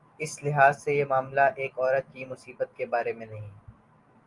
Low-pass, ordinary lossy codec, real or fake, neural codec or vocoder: 10.8 kHz; Opus, 32 kbps; real; none